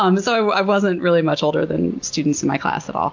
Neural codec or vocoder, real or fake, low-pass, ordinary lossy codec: none; real; 7.2 kHz; MP3, 48 kbps